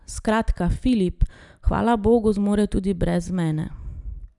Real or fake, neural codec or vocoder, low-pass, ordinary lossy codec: real; none; 10.8 kHz; none